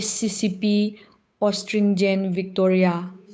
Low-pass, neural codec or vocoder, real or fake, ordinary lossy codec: none; codec, 16 kHz, 8 kbps, FunCodec, trained on Chinese and English, 25 frames a second; fake; none